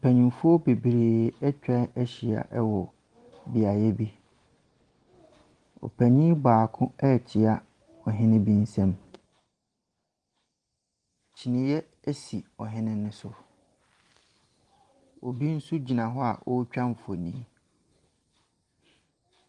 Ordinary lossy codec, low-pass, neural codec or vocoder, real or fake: Opus, 24 kbps; 10.8 kHz; none; real